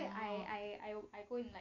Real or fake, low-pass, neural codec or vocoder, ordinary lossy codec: real; 7.2 kHz; none; AAC, 48 kbps